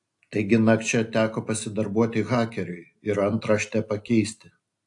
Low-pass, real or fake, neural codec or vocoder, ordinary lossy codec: 10.8 kHz; real; none; AAC, 64 kbps